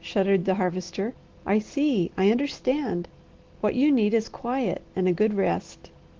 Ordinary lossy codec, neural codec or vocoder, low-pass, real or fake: Opus, 16 kbps; none; 7.2 kHz; real